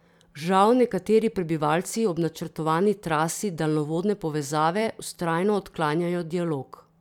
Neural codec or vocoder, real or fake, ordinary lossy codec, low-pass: vocoder, 44.1 kHz, 128 mel bands every 512 samples, BigVGAN v2; fake; none; 19.8 kHz